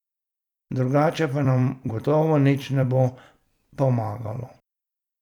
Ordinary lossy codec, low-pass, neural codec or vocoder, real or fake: none; 19.8 kHz; vocoder, 44.1 kHz, 128 mel bands every 256 samples, BigVGAN v2; fake